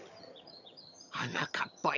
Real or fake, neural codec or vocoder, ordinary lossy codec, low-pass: fake; vocoder, 22.05 kHz, 80 mel bands, HiFi-GAN; none; 7.2 kHz